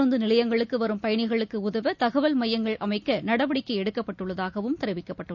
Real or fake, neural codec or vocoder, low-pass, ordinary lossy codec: real; none; 7.2 kHz; none